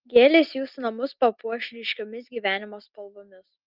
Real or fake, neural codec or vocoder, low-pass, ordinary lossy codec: real; none; 5.4 kHz; Opus, 32 kbps